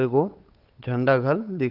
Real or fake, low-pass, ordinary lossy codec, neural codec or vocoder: fake; 5.4 kHz; Opus, 32 kbps; codec, 16 kHz, 8 kbps, FunCodec, trained on Chinese and English, 25 frames a second